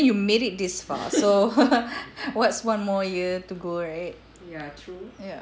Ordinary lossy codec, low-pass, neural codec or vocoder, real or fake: none; none; none; real